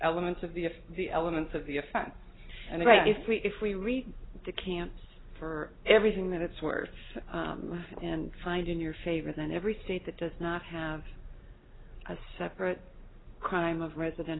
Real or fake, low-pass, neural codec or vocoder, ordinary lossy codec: real; 7.2 kHz; none; AAC, 16 kbps